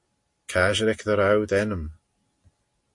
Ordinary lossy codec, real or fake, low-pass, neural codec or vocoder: MP3, 48 kbps; real; 10.8 kHz; none